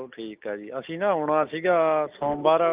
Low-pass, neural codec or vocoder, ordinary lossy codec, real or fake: 3.6 kHz; none; Opus, 24 kbps; real